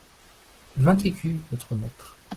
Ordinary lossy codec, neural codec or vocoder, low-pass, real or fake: Opus, 16 kbps; vocoder, 44.1 kHz, 128 mel bands, Pupu-Vocoder; 14.4 kHz; fake